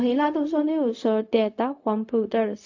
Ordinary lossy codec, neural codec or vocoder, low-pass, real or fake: AAC, 48 kbps; codec, 16 kHz, 0.4 kbps, LongCat-Audio-Codec; 7.2 kHz; fake